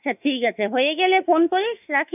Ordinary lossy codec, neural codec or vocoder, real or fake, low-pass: none; autoencoder, 48 kHz, 32 numbers a frame, DAC-VAE, trained on Japanese speech; fake; 3.6 kHz